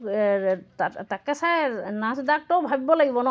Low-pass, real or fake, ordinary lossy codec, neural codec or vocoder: none; real; none; none